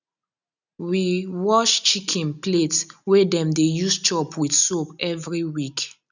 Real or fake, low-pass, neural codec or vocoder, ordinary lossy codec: real; 7.2 kHz; none; none